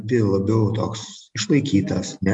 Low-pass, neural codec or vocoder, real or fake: 10.8 kHz; none; real